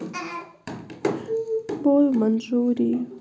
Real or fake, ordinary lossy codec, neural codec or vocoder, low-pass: real; none; none; none